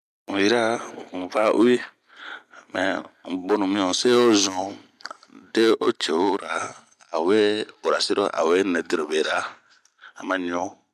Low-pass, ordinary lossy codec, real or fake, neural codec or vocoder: 14.4 kHz; MP3, 96 kbps; real; none